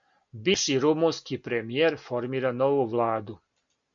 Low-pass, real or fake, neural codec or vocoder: 7.2 kHz; real; none